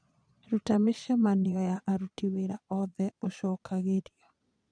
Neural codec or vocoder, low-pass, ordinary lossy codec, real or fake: vocoder, 22.05 kHz, 80 mel bands, Vocos; 9.9 kHz; none; fake